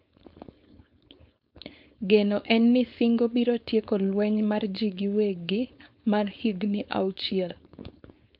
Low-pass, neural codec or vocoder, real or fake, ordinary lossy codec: 5.4 kHz; codec, 16 kHz, 4.8 kbps, FACodec; fake; MP3, 48 kbps